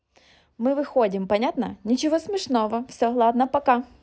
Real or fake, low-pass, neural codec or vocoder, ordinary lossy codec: real; none; none; none